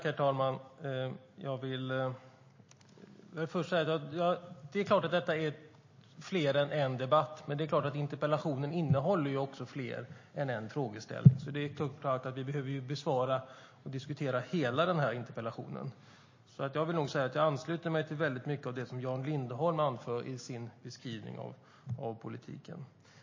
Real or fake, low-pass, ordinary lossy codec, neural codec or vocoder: real; 7.2 kHz; MP3, 32 kbps; none